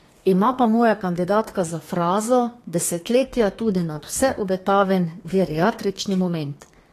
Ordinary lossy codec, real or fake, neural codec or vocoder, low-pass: AAC, 48 kbps; fake; codec, 32 kHz, 1.9 kbps, SNAC; 14.4 kHz